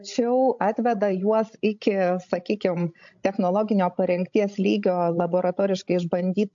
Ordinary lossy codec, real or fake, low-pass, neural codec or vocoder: AAC, 64 kbps; fake; 7.2 kHz; codec, 16 kHz, 16 kbps, FreqCodec, larger model